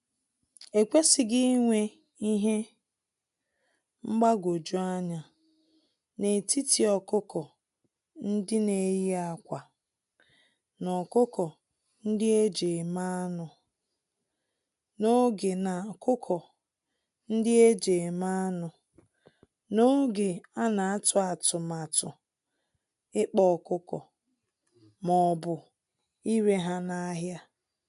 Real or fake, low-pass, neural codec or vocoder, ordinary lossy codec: real; 10.8 kHz; none; AAC, 96 kbps